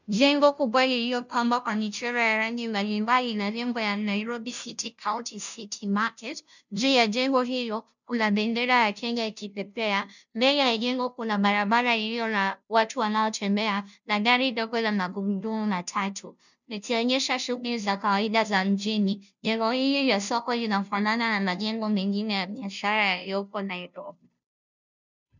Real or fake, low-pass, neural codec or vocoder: fake; 7.2 kHz; codec, 16 kHz, 0.5 kbps, FunCodec, trained on Chinese and English, 25 frames a second